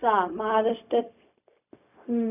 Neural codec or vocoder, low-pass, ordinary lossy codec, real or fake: codec, 16 kHz, 0.4 kbps, LongCat-Audio-Codec; 3.6 kHz; none; fake